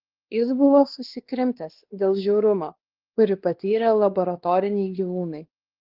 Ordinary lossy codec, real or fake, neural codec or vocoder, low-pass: Opus, 16 kbps; fake; codec, 16 kHz, 2 kbps, X-Codec, WavLM features, trained on Multilingual LibriSpeech; 5.4 kHz